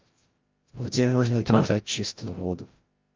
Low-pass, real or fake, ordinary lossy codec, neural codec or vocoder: 7.2 kHz; fake; Opus, 32 kbps; codec, 16 kHz, 0.5 kbps, FreqCodec, larger model